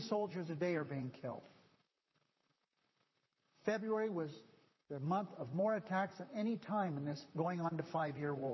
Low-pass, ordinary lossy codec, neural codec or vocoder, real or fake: 7.2 kHz; MP3, 24 kbps; vocoder, 44.1 kHz, 128 mel bands, Pupu-Vocoder; fake